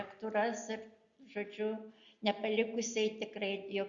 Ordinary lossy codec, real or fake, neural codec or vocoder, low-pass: Opus, 64 kbps; real; none; 7.2 kHz